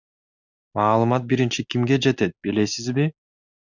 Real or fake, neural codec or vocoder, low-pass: real; none; 7.2 kHz